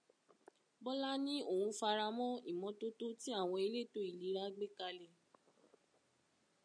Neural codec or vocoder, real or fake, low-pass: none; real; 9.9 kHz